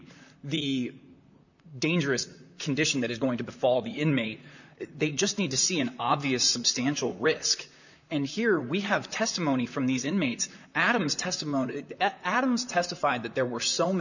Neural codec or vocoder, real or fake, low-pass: vocoder, 44.1 kHz, 128 mel bands, Pupu-Vocoder; fake; 7.2 kHz